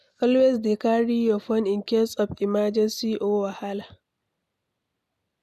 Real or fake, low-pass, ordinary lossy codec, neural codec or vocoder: real; 14.4 kHz; none; none